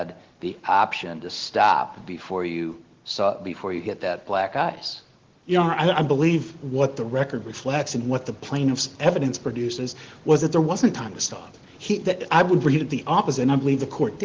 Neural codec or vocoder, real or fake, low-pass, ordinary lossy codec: none; real; 7.2 kHz; Opus, 16 kbps